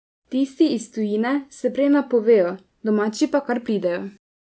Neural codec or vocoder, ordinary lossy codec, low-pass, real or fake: none; none; none; real